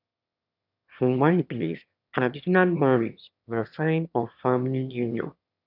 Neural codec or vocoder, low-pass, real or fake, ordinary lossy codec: autoencoder, 22.05 kHz, a latent of 192 numbers a frame, VITS, trained on one speaker; 5.4 kHz; fake; none